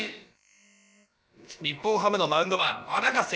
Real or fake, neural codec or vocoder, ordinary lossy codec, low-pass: fake; codec, 16 kHz, about 1 kbps, DyCAST, with the encoder's durations; none; none